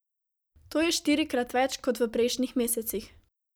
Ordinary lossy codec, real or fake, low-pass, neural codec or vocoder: none; real; none; none